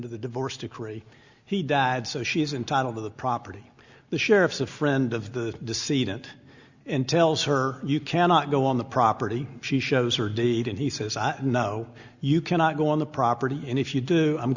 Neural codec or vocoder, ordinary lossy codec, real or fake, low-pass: none; Opus, 64 kbps; real; 7.2 kHz